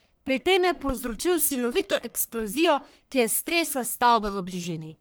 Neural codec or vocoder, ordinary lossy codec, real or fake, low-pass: codec, 44.1 kHz, 1.7 kbps, Pupu-Codec; none; fake; none